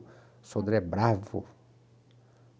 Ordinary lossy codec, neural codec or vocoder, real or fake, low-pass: none; none; real; none